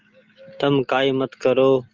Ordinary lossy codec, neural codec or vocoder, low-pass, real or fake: Opus, 32 kbps; none; 7.2 kHz; real